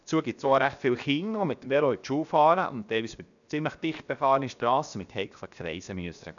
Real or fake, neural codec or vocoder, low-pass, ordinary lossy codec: fake; codec, 16 kHz, 0.7 kbps, FocalCodec; 7.2 kHz; none